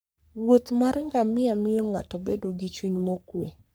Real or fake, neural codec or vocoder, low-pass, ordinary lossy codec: fake; codec, 44.1 kHz, 3.4 kbps, Pupu-Codec; none; none